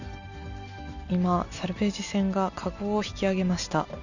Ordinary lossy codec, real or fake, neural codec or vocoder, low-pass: none; real; none; 7.2 kHz